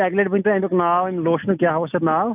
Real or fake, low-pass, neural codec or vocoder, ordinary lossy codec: real; 3.6 kHz; none; none